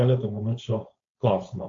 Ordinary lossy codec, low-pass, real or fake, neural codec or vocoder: AAC, 48 kbps; 7.2 kHz; fake; codec, 16 kHz, 4.8 kbps, FACodec